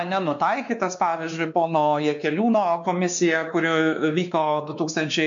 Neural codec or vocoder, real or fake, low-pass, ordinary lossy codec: codec, 16 kHz, 2 kbps, X-Codec, WavLM features, trained on Multilingual LibriSpeech; fake; 7.2 kHz; MP3, 64 kbps